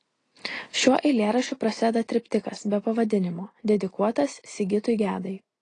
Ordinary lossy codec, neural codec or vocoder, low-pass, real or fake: AAC, 32 kbps; none; 9.9 kHz; real